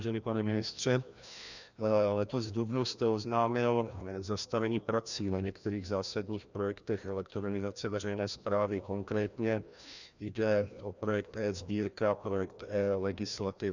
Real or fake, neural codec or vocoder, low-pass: fake; codec, 16 kHz, 1 kbps, FreqCodec, larger model; 7.2 kHz